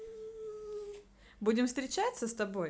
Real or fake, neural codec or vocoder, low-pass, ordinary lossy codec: real; none; none; none